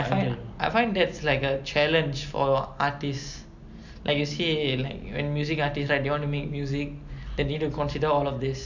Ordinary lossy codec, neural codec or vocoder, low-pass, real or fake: none; none; 7.2 kHz; real